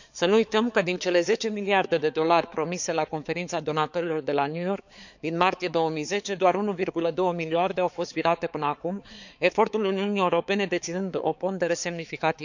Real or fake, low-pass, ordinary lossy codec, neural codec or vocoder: fake; 7.2 kHz; none; codec, 16 kHz, 4 kbps, X-Codec, HuBERT features, trained on balanced general audio